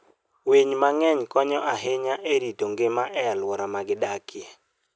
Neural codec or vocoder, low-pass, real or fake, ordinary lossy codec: none; none; real; none